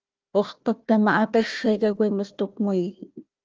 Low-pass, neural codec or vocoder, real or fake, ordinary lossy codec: 7.2 kHz; codec, 16 kHz, 1 kbps, FunCodec, trained on Chinese and English, 50 frames a second; fake; Opus, 24 kbps